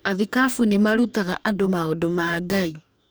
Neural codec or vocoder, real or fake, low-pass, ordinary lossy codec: codec, 44.1 kHz, 2.6 kbps, DAC; fake; none; none